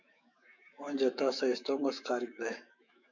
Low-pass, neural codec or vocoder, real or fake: 7.2 kHz; autoencoder, 48 kHz, 128 numbers a frame, DAC-VAE, trained on Japanese speech; fake